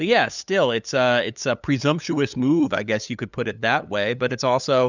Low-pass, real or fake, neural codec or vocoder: 7.2 kHz; fake; codec, 16 kHz, 8 kbps, FunCodec, trained on LibriTTS, 25 frames a second